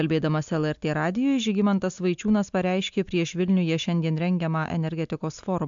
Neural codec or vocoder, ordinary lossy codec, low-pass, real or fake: none; MP3, 64 kbps; 7.2 kHz; real